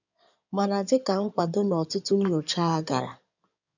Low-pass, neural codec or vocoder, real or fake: 7.2 kHz; codec, 16 kHz in and 24 kHz out, 2.2 kbps, FireRedTTS-2 codec; fake